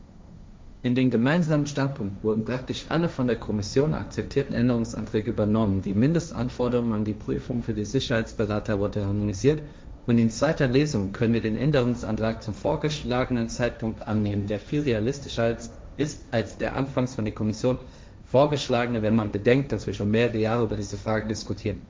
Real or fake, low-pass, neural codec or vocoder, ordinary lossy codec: fake; none; codec, 16 kHz, 1.1 kbps, Voila-Tokenizer; none